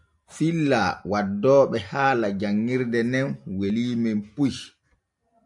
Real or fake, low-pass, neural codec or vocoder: real; 10.8 kHz; none